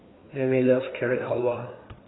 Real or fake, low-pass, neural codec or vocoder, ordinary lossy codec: fake; 7.2 kHz; codec, 16 kHz, 4 kbps, FreqCodec, larger model; AAC, 16 kbps